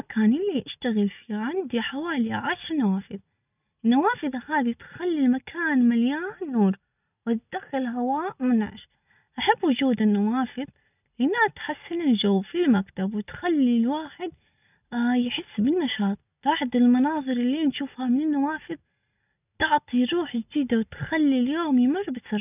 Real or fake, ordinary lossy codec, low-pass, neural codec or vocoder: real; none; 3.6 kHz; none